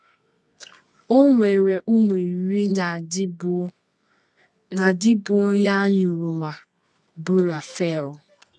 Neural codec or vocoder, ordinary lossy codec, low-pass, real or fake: codec, 24 kHz, 0.9 kbps, WavTokenizer, medium music audio release; none; none; fake